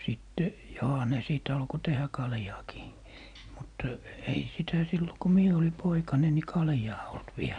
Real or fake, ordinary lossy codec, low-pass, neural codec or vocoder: real; none; 9.9 kHz; none